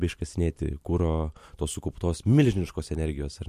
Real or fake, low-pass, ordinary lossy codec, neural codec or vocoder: real; 14.4 kHz; MP3, 64 kbps; none